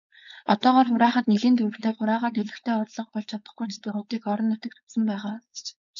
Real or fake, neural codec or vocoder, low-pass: fake; codec, 16 kHz, 4.8 kbps, FACodec; 7.2 kHz